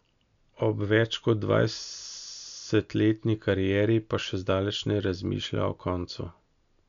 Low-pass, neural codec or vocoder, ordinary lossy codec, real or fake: 7.2 kHz; none; none; real